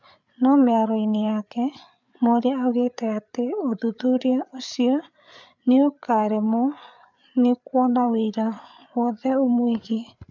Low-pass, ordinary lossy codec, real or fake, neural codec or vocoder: 7.2 kHz; none; fake; codec, 16 kHz, 8 kbps, FreqCodec, larger model